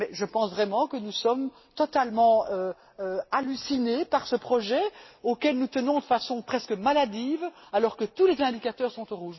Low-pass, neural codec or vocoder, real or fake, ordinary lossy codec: 7.2 kHz; none; real; MP3, 24 kbps